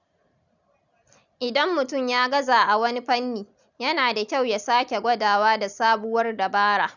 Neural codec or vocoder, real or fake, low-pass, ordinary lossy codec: none; real; 7.2 kHz; none